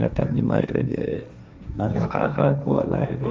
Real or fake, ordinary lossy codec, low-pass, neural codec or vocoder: fake; none; none; codec, 16 kHz, 1.1 kbps, Voila-Tokenizer